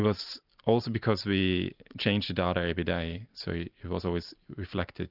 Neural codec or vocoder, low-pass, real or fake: none; 5.4 kHz; real